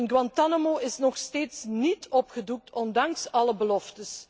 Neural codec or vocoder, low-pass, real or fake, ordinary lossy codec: none; none; real; none